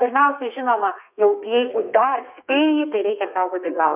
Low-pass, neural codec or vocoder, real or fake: 3.6 kHz; codec, 32 kHz, 1.9 kbps, SNAC; fake